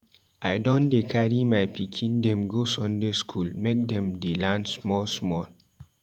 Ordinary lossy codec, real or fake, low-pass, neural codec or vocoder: none; fake; 19.8 kHz; vocoder, 48 kHz, 128 mel bands, Vocos